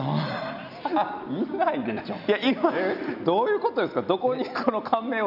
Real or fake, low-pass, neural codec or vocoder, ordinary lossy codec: real; 5.4 kHz; none; none